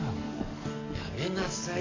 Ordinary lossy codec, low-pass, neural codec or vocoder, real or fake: AAC, 48 kbps; 7.2 kHz; codec, 44.1 kHz, 7.8 kbps, DAC; fake